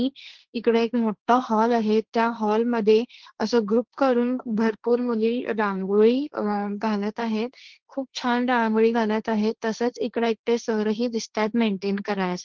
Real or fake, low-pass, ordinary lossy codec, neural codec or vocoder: fake; 7.2 kHz; Opus, 16 kbps; codec, 16 kHz, 1.1 kbps, Voila-Tokenizer